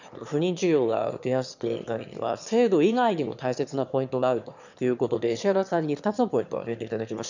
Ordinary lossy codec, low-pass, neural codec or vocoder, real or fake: none; 7.2 kHz; autoencoder, 22.05 kHz, a latent of 192 numbers a frame, VITS, trained on one speaker; fake